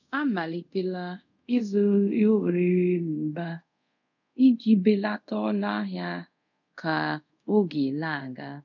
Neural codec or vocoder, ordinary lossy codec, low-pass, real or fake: codec, 24 kHz, 0.5 kbps, DualCodec; none; 7.2 kHz; fake